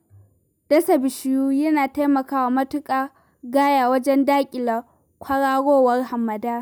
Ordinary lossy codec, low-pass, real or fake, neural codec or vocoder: none; none; real; none